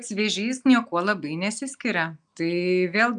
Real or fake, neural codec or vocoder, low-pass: real; none; 9.9 kHz